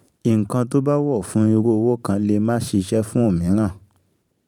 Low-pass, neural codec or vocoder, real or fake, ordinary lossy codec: 19.8 kHz; vocoder, 44.1 kHz, 128 mel bands every 512 samples, BigVGAN v2; fake; none